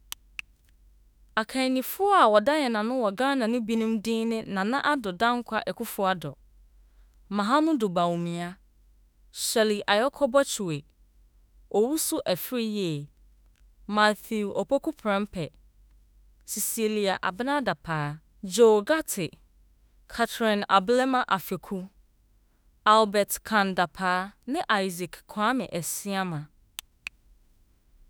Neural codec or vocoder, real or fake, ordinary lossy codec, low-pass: autoencoder, 48 kHz, 32 numbers a frame, DAC-VAE, trained on Japanese speech; fake; none; none